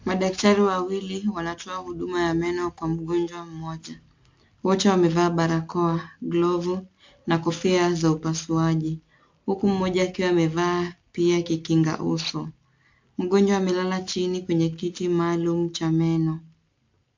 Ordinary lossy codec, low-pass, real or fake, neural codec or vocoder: MP3, 48 kbps; 7.2 kHz; real; none